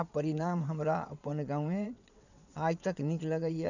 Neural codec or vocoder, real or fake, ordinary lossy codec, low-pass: vocoder, 22.05 kHz, 80 mel bands, WaveNeXt; fake; none; 7.2 kHz